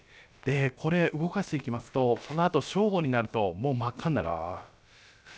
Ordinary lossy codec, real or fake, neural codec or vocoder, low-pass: none; fake; codec, 16 kHz, about 1 kbps, DyCAST, with the encoder's durations; none